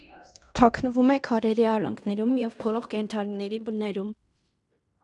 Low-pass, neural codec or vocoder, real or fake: 10.8 kHz; codec, 16 kHz in and 24 kHz out, 0.9 kbps, LongCat-Audio-Codec, fine tuned four codebook decoder; fake